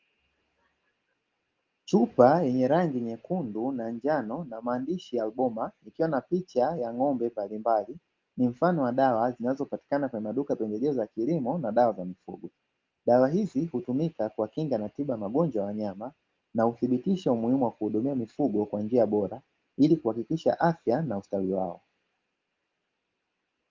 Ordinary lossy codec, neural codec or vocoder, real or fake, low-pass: Opus, 32 kbps; none; real; 7.2 kHz